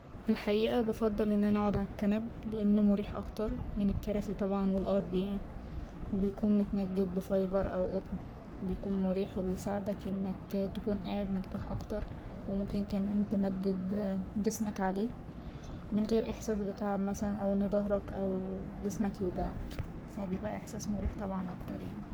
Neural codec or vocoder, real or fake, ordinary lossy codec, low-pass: codec, 44.1 kHz, 3.4 kbps, Pupu-Codec; fake; none; none